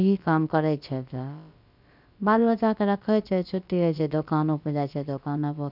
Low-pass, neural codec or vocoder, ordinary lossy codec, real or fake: 5.4 kHz; codec, 16 kHz, about 1 kbps, DyCAST, with the encoder's durations; none; fake